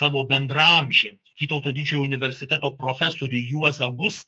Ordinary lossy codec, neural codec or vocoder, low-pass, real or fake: MP3, 64 kbps; codec, 44.1 kHz, 2.6 kbps, SNAC; 9.9 kHz; fake